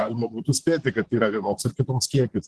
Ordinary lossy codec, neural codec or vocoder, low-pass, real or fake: Opus, 16 kbps; vocoder, 44.1 kHz, 128 mel bands, Pupu-Vocoder; 10.8 kHz; fake